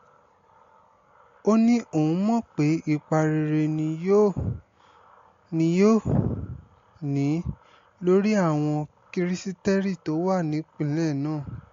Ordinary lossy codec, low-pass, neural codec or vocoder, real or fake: AAC, 48 kbps; 7.2 kHz; none; real